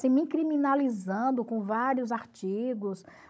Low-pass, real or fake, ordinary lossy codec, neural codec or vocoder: none; fake; none; codec, 16 kHz, 16 kbps, FunCodec, trained on Chinese and English, 50 frames a second